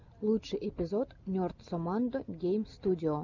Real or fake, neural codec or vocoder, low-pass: real; none; 7.2 kHz